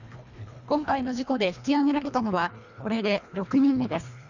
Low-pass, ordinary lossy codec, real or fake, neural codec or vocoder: 7.2 kHz; none; fake; codec, 24 kHz, 1.5 kbps, HILCodec